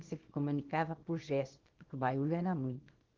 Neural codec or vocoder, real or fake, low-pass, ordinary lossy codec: codec, 24 kHz, 0.9 kbps, WavTokenizer, small release; fake; 7.2 kHz; Opus, 16 kbps